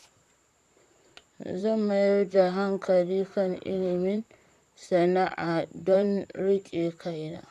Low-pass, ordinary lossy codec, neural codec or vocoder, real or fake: 14.4 kHz; none; vocoder, 44.1 kHz, 128 mel bands, Pupu-Vocoder; fake